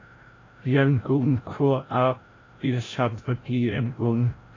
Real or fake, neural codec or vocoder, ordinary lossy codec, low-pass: fake; codec, 16 kHz, 0.5 kbps, FreqCodec, larger model; AAC, 32 kbps; 7.2 kHz